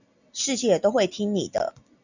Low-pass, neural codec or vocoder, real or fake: 7.2 kHz; none; real